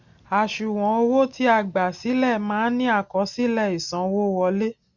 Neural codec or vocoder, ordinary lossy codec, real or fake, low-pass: none; Opus, 64 kbps; real; 7.2 kHz